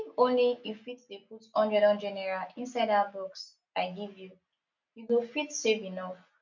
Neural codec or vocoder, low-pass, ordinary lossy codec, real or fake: none; 7.2 kHz; none; real